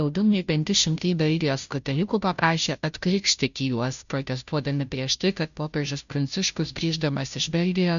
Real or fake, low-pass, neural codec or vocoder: fake; 7.2 kHz; codec, 16 kHz, 0.5 kbps, FunCodec, trained on Chinese and English, 25 frames a second